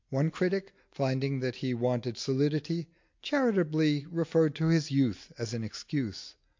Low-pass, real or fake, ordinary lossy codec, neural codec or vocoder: 7.2 kHz; real; MP3, 48 kbps; none